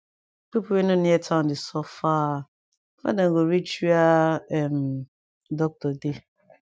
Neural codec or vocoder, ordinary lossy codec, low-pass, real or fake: none; none; none; real